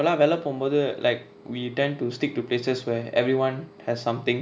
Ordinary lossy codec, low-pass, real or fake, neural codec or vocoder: none; none; real; none